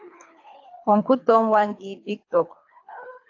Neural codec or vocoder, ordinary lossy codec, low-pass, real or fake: codec, 24 kHz, 3 kbps, HILCodec; AAC, 48 kbps; 7.2 kHz; fake